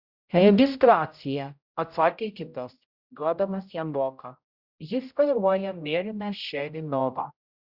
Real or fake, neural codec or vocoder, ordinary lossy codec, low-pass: fake; codec, 16 kHz, 0.5 kbps, X-Codec, HuBERT features, trained on general audio; Opus, 64 kbps; 5.4 kHz